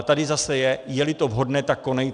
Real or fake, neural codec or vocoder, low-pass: real; none; 9.9 kHz